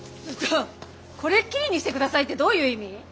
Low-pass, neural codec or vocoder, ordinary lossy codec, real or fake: none; none; none; real